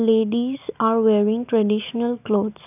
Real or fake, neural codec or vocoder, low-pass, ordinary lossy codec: real; none; 3.6 kHz; none